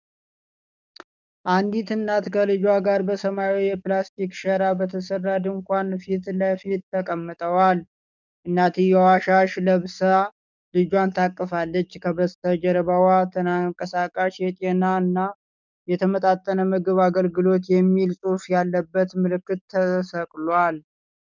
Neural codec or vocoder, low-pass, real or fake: codec, 44.1 kHz, 7.8 kbps, DAC; 7.2 kHz; fake